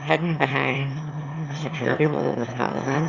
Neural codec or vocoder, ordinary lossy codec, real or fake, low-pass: autoencoder, 22.05 kHz, a latent of 192 numbers a frame, VITS, trained on one speaker; Opus, 64 kbps; fake; 7.2 kHz